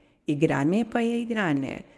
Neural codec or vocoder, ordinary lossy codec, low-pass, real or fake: codec, 24 kHz, 0.9 kbps, WavTokenizer, medium speech release version 1; none; none; fake